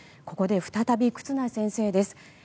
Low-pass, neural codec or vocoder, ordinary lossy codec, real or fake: none; none; none; real